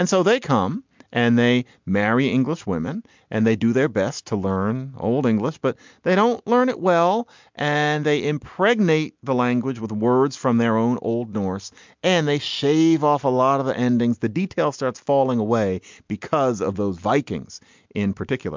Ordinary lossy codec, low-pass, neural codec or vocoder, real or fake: MP3, 64 kbps; 7.2 kHz; none; real